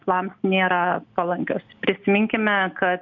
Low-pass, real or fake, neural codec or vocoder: 7.2 kHz; real; none